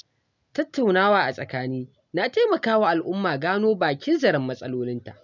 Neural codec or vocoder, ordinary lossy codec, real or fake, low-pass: none; none; real; 7.2 kHz